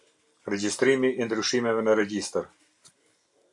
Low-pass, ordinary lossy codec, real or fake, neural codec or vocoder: 10.8 kHz; AAC, 64 kbps; real; none